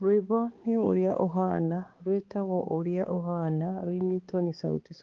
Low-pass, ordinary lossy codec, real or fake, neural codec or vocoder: 7.2 kHz; Opus, 16 kbps; fake; codec, 16 kHz, 2 kbps, X-Codec, HuBERT features, trained on balanced general audio